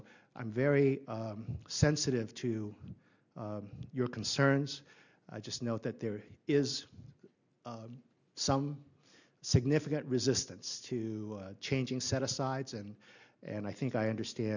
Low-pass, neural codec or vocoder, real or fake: 7.2 kHz; none; real